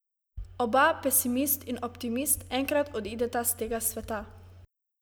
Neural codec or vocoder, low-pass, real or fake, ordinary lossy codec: none; none; real; none